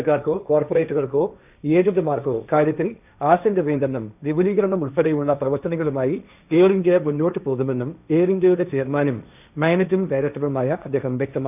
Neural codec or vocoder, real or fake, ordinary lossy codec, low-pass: codec, 16 kHz, 1.1 kbps, Voila-Tokenizer; fake; none; 3.6 kHz